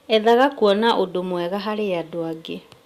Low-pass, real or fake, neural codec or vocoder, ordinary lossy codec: 14.4 kHz; real; none; Opus, 64 kbps